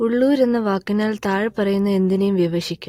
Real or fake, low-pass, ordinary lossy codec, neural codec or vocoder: real; 19.8 kHz; AAC, 48 kbps; none